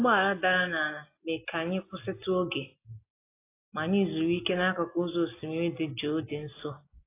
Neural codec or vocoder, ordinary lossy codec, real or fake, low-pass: none; AAC, 24 kbps; real; 3.6 kHz